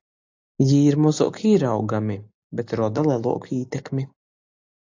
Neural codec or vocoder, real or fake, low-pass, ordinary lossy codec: none; real; 7.2 kHz; AAC, 48 kbps